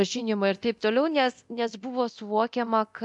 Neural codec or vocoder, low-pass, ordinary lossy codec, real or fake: codec, 24 kHz, 0.9 kbps, DualCodec; 10.8 kHz; MP3, 96 kbps; fake